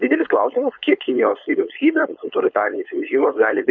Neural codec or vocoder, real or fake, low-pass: codec, 16 kHz, 4.8 kbps, FACodec; fake; 7.2 kHz